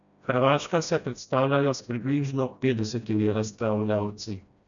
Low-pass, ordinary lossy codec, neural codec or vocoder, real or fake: 7.2 kHz; none; codec, 16 kHz, 1 kbps, FreqCodec, smaller model; fake